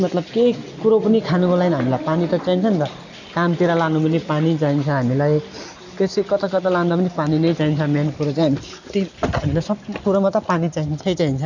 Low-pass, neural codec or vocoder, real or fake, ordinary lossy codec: 7.2 kHz; none; real; none